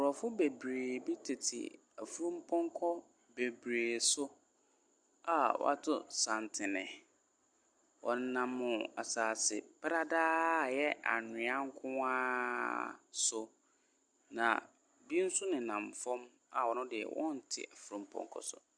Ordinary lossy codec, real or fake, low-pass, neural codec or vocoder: Opus, 64 kbps; real; 9.9 kHz; none